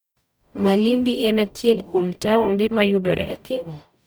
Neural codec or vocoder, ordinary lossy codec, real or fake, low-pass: codec, 44.1 kHz, 0.9 kbps, DAC; none; fake; none